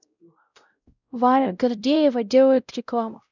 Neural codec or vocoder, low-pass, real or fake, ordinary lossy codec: codec, 16 kHz, 0.5 kbps, X-Codec, WavLM features, trained on Multilingual LibriSpeech; 7.2 kHz; fake; none